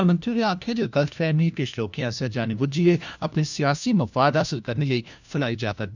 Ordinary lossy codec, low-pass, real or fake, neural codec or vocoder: none; 7.2 kHz; fake; codec, 16 kHz, 1 kbps, FunCodec, trained on LibriTTS, 50 frames a second